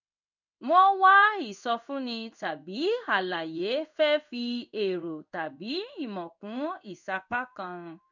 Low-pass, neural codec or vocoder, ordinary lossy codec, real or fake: 7.2 kHz; codec, 16 kHz in and 24 kHz out, 1 kbps, XY-Tokenizer; none; fake